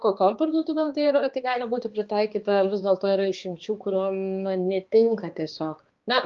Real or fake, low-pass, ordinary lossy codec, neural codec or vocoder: fake; 7.2 kHz; Opus, 32 kbps; codec, 16 kHz, 2 kbps, X-Codec, HuBERT features, trained on balanced general audio